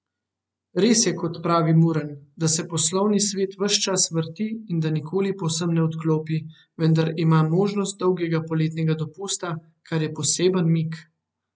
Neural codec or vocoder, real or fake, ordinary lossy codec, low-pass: none; real; none; none